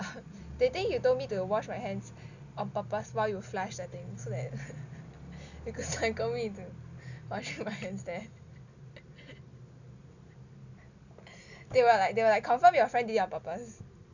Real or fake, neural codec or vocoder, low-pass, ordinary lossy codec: real; none; 7.2 kHz; none